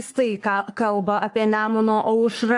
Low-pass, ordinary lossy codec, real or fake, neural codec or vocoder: 10.8 kHz; AAC, 64 kbps; fake; codec, 44.1 kHz, 3.4 kbps, Pupu-Codec